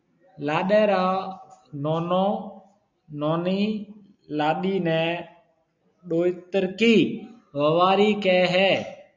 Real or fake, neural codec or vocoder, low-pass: real; none; 7.2 kHz